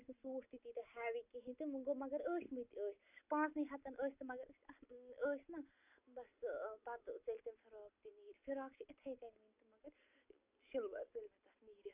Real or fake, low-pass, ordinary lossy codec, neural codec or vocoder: real; 3.6 kHz; none; none